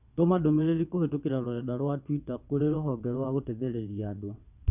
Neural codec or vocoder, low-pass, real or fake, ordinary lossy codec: vocoder, 22.05 kHz, 80 mel bands, WaveNeXt; 3.6 kHz; fake; none